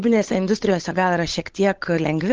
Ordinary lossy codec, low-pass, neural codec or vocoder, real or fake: Opus, 16 kbps; 7.2 kHz; codec, 16 kHz, 8 kbps, FunCodec, trained on Chinese and English, 25 frames a second; fake